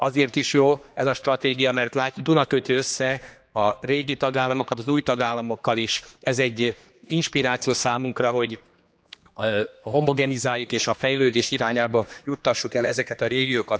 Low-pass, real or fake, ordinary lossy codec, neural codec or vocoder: none; fake; none; codec, 16 kHz, 2 kbps, X-Codec, HuBERT features, trained on general audio